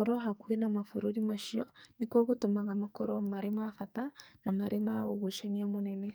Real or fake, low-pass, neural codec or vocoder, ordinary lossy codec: fake; none; codec, 44.1 kHz, 2.6 kbps, SNAC; none